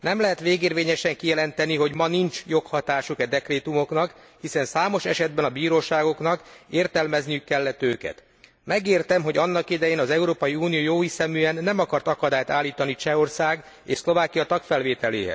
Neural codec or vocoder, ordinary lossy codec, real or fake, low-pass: none; none; real; none